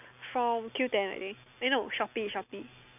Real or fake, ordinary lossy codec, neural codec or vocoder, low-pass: real; none; none; 3.6 kHz